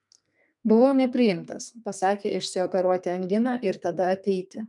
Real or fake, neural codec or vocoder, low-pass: fake; codec, 32 kHz, 1.9 kbps, SNAC; 10.8 kHz